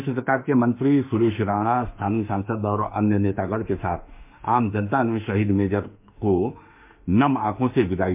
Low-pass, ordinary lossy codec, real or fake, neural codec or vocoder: 3.6 kHz; MP3, 32 kbps; fake; autoencoder, 48 kHz, 32 numbers a frame, DAC-VAE, trained on Japanese speech